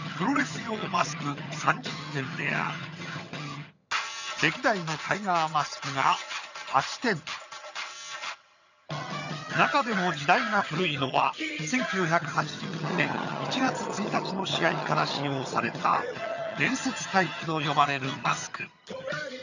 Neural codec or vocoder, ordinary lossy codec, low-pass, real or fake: vocoder, 22.05 kHz, 80 mel bands, HiFi-GAN; none; 7.2 kHz; fake